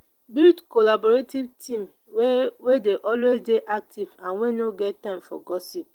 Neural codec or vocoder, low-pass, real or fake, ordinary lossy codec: vocoder, 44.1 kHz, 128 mel bands every 512 samples, BigVGAN v2; 19.8 kHz; fake; Opus, 24 kbps